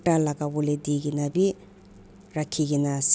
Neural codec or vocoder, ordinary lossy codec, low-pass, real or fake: none; none; none; real